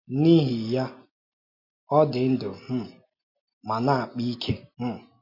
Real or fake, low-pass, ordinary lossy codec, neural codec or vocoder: real; 5.4 kHz; MP3, 32 kbps; none